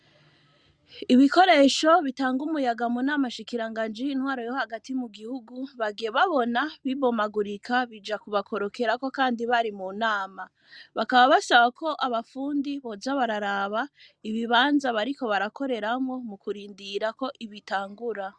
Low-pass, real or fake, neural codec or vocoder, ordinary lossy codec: 9.9 kHz; real; none; Opus, 64 kbps